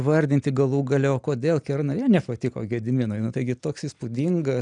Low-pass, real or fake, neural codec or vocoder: 9.9 kHz; real; none